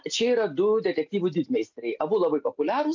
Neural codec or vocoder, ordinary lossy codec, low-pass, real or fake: none; MP3, 64 kbps; 7.2 kHz; real